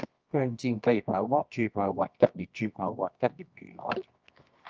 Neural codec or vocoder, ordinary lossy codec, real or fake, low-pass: codec, 24 kHz, 0.9 kbps, WavTokenizer, medium music audio release; Opus, 24 kbps; fake; 7.2 kHz